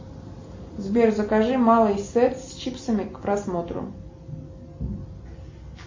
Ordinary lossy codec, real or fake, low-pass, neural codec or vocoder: MP3, 32 kbps; real; 7.2 kHz; none